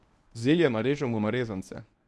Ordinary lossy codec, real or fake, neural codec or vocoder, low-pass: none; fake; codec, 24 kHz, 0.9 kbps, WavTokenizer, medium speech release version 1; none